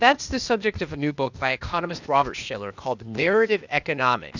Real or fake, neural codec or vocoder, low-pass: fake; codec, 16 kHz, 0.8 kbps, ZipCodec; 7.2 kHz